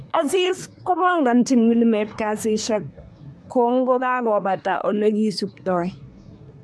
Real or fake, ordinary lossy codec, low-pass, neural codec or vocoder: fake; none; none; codec, 24 kHz, 1 kbps, SNAC